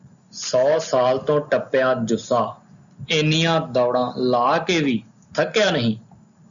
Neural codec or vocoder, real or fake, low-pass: none; real; 7.2 kHz